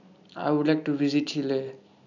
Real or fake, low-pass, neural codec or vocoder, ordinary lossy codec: real; 7.2 kHz; none; none